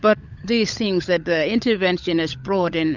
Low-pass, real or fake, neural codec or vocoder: 7.2 kHz; fake; codec, 16 kHz, 16 kbps, FunCodec, trained on LibriTTS, 50 frames a second